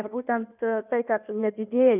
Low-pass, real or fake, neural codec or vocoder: 3.6 kHz; fake; codec, 16 kHz in and 24 kHz out, 1.1 kbps, FireRedTTS-2 codec